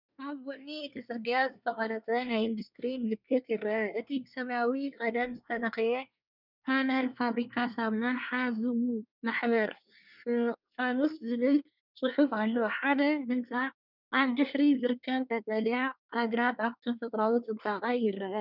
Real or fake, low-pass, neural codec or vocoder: fake; 5.4 kHz; codec, 24 kHz, 1 kbps, SNAC